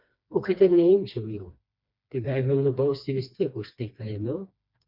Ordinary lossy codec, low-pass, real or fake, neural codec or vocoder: MP3, 48 kbps; 5.4 kHz; fake; codec, 16 kHz, 2 kbps, FreqCodec, smaller model